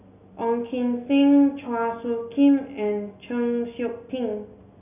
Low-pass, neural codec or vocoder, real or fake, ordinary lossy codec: 3.6 kHz; none; real; none